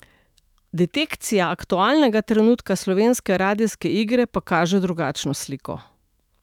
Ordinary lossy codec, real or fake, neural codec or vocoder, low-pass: none; fake; autoencoder, 48 kHz, 128 numbers a frame, DAC-VAE, trained on Japanese speech; 19.8 kHz